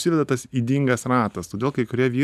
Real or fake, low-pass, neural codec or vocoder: real; 14.4 kHz; none